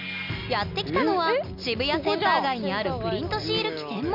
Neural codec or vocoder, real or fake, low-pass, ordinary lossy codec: none; real; 5.4 kHz; none